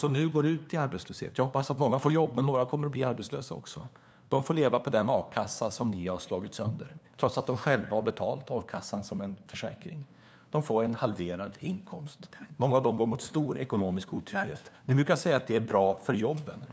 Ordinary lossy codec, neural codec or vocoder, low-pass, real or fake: none; codec, 16 kHz, 2 kbps, FunCodec, trained on LibriTTS, 25 frames a second; none; fake